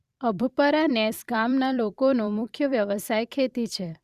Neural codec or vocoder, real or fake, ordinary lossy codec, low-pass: vocoder, 44.1 kHz, 128 mel bands every 256 samples, BigVGAN v2; fake; Opus, 64 kbps; 14.4 kHz